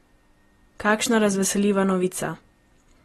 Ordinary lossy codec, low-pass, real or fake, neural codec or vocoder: AAC, 32 kbps; 19.8 kHz; real; none